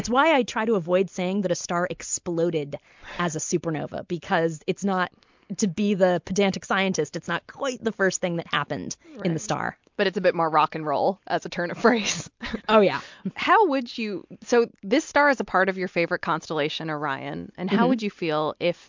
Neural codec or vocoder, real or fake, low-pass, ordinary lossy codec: none; real; 7.2 kHz; MP3, 64 kbps